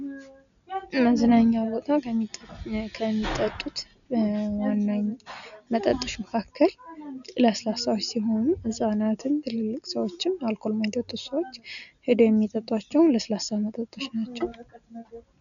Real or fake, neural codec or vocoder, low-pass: real; none; 7.2 kHz